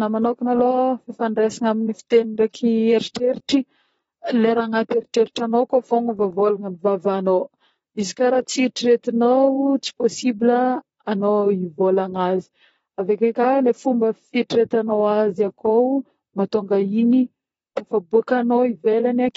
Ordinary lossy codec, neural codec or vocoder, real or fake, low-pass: AAC, 24 kbps; vocoder, 44.1 kHz, 128 mel bands, Pupu-Vocoder; fake; 19.8 kHz